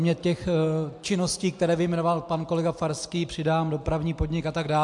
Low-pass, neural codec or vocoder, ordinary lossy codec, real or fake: 10.8 kHz; none; MP3, 64 kbps; real